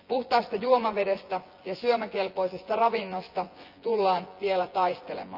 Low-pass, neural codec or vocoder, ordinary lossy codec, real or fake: 5.4 kHz; vocoder, 24 kHz, 100 mel bands, Vocos; Opus, 24 kbps; fake